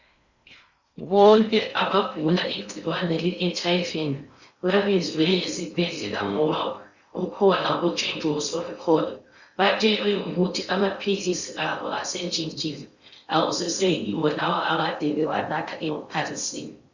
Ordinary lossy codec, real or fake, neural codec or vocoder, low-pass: Opus, 64 kbps; fake; codec, 16 kHz in and 24 kHz out, 0.6 kbps, FocalCodec, streaming, 4096 codes; 7.2 kHz